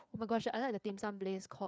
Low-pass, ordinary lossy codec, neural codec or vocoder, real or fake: none; none; codec, 16 kHz, 4 kbps, FreqCodec, larger model; fake